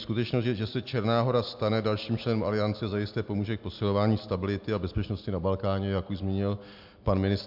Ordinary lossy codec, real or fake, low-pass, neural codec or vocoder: MP3, 48 kbps; real; 5.4 kHz; none